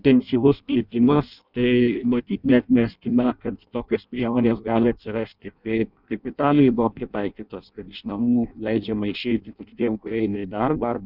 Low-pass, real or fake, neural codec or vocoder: 5.4 kHz; fake; codec, 16 kHz in and 24 kHz out, 0.6 kbps, FireRedTTS-2 codec